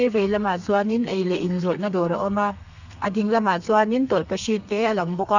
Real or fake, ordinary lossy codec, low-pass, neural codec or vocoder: fake; none; 7.2 kHz; codec, 32 kHz, 1.9 kbps, SNAC